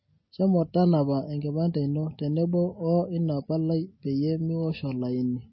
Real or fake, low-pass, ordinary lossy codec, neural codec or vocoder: real; 7.2 kHz; MP3, 24 kbps; none